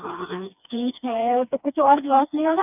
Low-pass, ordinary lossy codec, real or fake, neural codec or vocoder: 3.6 kHz; none; fake; codec, 16 kHz, 2 kbps, FreqCodec, smaller model